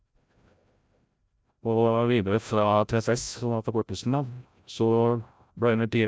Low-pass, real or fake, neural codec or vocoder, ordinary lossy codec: none; fake; codec, 16 kHz, 0.5 kbps, FreqCodec, larger model; none